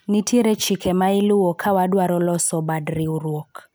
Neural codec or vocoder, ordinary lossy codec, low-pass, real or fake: none; none; none; real